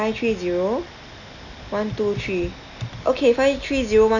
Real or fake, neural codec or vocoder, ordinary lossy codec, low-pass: real; none; none; 7.2 kHz